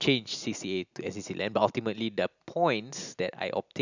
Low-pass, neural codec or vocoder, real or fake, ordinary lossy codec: 7.2 kHz; none; real; none